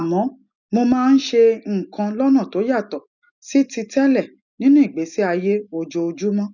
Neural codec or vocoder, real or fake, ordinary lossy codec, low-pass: none; real; none; 7.2 kHz